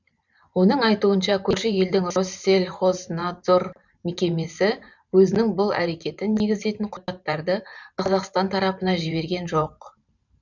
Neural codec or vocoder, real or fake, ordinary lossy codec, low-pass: vocoder, 44.1 kHz, 128 mel bands every 512 samples, BigVGAN v2; fake; none; 7.2 kHz